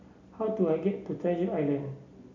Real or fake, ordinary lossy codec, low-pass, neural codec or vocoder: real; MP3, 64 kbps; 7.2 kHz; none